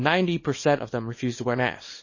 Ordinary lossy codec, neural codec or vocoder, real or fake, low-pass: MP3, 32 kbps; codec, 24 kHz, 0.9 kbps, WavTokenizer, small release; fake; 7.2 kHz